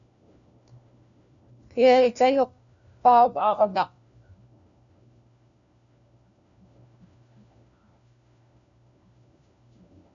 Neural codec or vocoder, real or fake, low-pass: codec, 16 kHz, 1 kbps, FunCodec, trained on LibriTTS, 50 frames a second; fake; 7.2 kHz